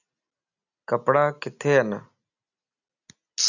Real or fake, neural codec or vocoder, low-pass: real; none; 7.2 kHz